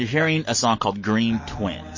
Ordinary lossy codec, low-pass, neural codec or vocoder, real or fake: MP3, 32 kbps; 7.2 kHz; none; real